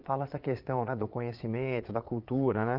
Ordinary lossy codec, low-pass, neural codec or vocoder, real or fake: Opus, 32 kbps; 5.4 kHz; vocoder, 22.05 kHz, 80 mel bands, Vocos; fake